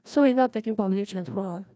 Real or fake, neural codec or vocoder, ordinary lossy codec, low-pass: fake; codec, 16 kHz, 1 kbps, FreqCodec, larger model; none; none